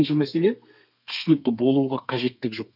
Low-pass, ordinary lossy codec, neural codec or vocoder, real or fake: 5.4 kHz; none; codec, 32 kHz, 1.9 kbps, SNAC; fake